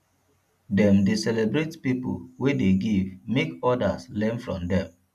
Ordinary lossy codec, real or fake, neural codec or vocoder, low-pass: MP3, 96 kbps; real; none; 14.4 kHz